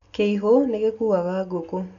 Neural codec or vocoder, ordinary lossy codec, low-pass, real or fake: none; none; 7.2 kHz; real